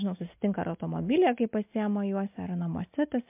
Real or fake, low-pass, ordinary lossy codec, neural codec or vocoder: real; 3.6 kHz; MP3, 32 kbps; none